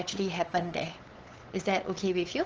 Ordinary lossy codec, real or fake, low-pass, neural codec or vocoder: Opus, 16 kbps; fake; 7.2 kHz; codec, 16 kHz, 8 kbps, FunCodec, trained on LibriTTS, 25 frames a second